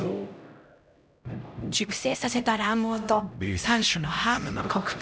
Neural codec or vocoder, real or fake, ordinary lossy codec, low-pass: codec, 16 kHz, 0.5 kbps, X-Codec, HuBERT features, trained on LibriSpeech; fake; none; none